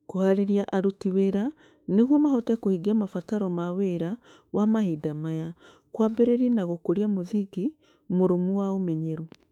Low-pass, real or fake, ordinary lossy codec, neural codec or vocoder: 19.8 kHz; fake; none; autoencoder, 48 kHz, 32 numbers a frame, DAC-VAE, trained on Japanese speech